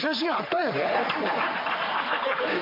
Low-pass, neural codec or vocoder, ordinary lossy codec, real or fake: 5.4 kHz; codec, 44.1 kHz, 3.4 kbps, Pupu-Codec; MP3, 48 kbps; fake